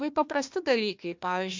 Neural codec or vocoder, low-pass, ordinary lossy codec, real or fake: codec, 16 kHz, 1 kbps, FunCodec, trained on Chinese and English, 50 frames a second; 7.2 kHz; MP3, 64 kbps; fake